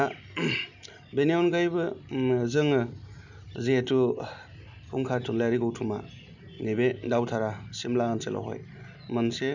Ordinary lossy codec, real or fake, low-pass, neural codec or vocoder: none; real; 7.2 kHz; none